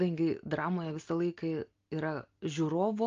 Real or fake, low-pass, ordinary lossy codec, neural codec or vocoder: real; 7.2 kHz; Opus, 32 kbps; none